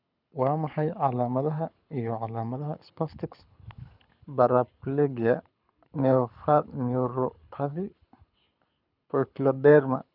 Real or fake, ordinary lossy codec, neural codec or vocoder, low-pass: fake; none; codec, 24 kHz, 6 kbps, HILCodec; 5.4 kHz